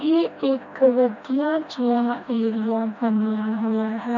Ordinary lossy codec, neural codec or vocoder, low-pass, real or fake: none; codec, 16 kHz, 1 kbps, FreqCodec, smaller model; 7.2 kHz; fake